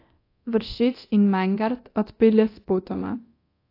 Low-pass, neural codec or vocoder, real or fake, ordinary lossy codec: 5.4 kHz; codec, 24 kHz, 0.9 kbps, DualCodec; fake; AAC, 32 kbps